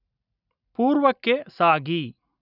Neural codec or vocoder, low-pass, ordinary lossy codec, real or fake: none; 5.4 kHz; none; real